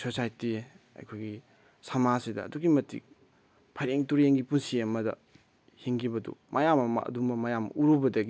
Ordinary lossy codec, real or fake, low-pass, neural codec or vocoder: none; real; none; none